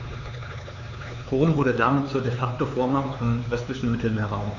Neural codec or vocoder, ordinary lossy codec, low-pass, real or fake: codec, 16 kHz, 4 kbps, X-Codec, HuBERT features, trained on LibriSpeech; none; 7.2 kHz; fake